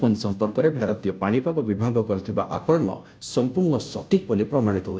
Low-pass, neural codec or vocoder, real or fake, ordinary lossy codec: none; codec, 16 kHz, 0.5 kbps, FunCodec, trained on Chinese and English, 25 frames a second; fake; none